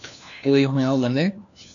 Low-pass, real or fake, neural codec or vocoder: 7.2 kHz; fake; codec, 16 kHz, 1 kbps, FunCodec, trained on LibriTTS, 50 frames a second